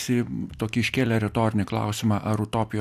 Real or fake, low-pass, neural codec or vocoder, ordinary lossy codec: real; 14.4 kHz; none; AAC, 96 kbps